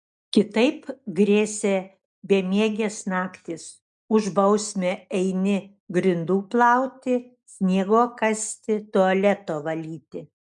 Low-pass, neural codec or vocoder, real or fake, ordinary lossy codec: 10.8 kHz; none; real; MP3, 96 kbps